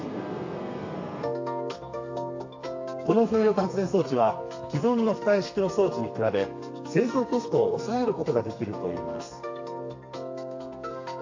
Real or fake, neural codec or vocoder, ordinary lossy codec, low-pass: fake; codec, 32 kHz, 1.9 kbps, SNAC; AAC, 48 kbps; 7.2 kHz